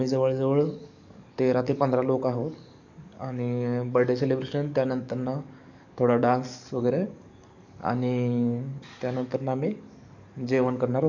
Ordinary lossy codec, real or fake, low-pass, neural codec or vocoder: none; fake; 7.2 kHz; codec, 44.1 kHz, 7.8 kbps, DAC